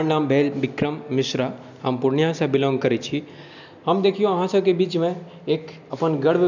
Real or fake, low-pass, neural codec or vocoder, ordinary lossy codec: real; 7.2 kHz; none; none